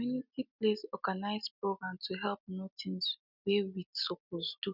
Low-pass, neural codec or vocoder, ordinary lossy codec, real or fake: 5.4 kHz; none; none; real